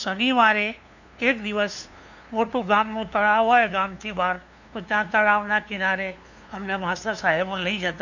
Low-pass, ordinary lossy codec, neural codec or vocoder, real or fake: 7.2 kHz; none; codec, 16 kHz, 2 kbps, FunCodec, trained on LibriTTS, 25 frames a second; fake